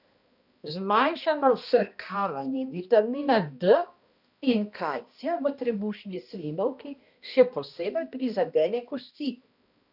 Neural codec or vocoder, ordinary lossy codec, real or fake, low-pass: codec, 16 kHz, 1 kbps, X-Codec, HuBERT features, trained on balanced general audio; none; fake; 5.4 kHz